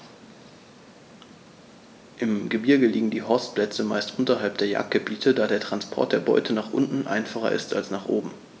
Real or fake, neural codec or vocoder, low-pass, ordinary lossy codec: real; none; none; none